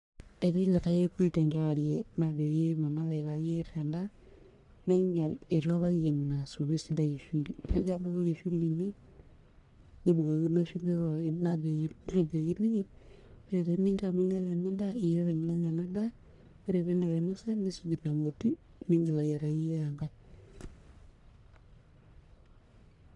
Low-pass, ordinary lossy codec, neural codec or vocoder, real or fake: 10.8 kHz; none; codec, 44.1 kHz, 1.7 kbps, Pupu-Codec; fake